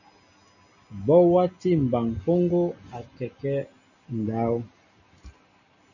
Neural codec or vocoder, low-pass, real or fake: none; 7.2 kHz; real